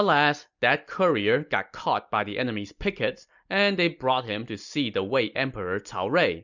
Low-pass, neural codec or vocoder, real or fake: 7.2 kHz; none; real